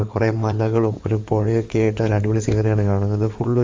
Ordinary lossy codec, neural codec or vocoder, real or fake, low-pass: Opus, 32 kbps; codec, 16 kHz in and 24 kHz out, 2.2 kbps, FireRedTTS-2 codec; fake; 7.2 kHz